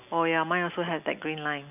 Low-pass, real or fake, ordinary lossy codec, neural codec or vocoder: 3.6 kHz; real; none; none